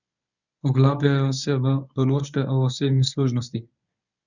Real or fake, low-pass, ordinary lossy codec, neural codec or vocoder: fake; 7.2 kHz; none; codec, 24 kHz, 0.9 kbps, WavTokenizer, medium speech release version 1